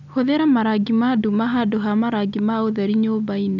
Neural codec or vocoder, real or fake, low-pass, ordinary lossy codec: none; real; 7.2 kHz; none